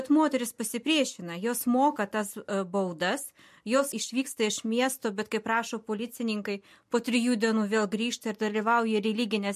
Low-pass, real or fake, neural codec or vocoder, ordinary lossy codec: 14.4 kHz; real; none; MP3, 64 kbps